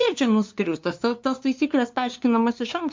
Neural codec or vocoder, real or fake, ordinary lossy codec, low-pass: codec, 16 kHz, 2 kbps, FunCodec, trained on LibriTTS, 25 frames a second; fake; MP3, 64 kbps; 7.2 kHz